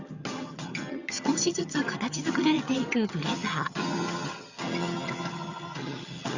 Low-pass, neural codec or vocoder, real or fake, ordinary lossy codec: 7.2 kHz; vocoder, 22.05 kHz, 80 mel bands, HiFi-GAN; fake; Opus, 64 kbps